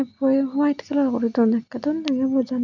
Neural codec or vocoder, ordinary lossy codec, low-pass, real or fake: none; none; 7.2 kHz; real